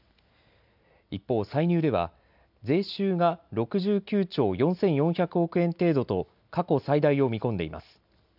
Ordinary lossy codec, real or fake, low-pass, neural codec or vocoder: none; real; 5.4 kHz; none